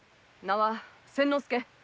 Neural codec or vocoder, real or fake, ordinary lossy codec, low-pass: none; real; none; none